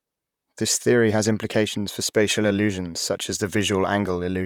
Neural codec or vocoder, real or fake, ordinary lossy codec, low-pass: vocoder, 44.1 kHz, 128 mel bands, Pupu-Vocoder; fake; none; 19.8 kHz